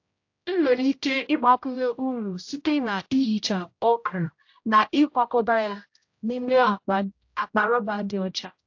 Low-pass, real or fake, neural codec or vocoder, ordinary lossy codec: 7.2 kHz; fake; codec, 16 kHz, 0.5 kbps, X-Codec, HuBERT features, trained on general audio; AAC, 48 kbps